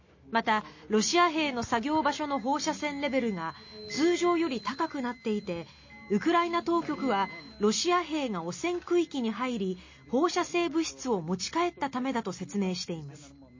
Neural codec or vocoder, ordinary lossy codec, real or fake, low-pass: none; MP3, 32 kbps; real; 7.2 kHz